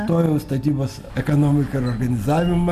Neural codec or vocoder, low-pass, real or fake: none; 14.4 kHz; real